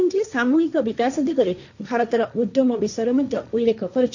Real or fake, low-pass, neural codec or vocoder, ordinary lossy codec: fake; 7.2 kHz; codec, 16 kHz, 1.1 kbps, Voila-Tokenizer; none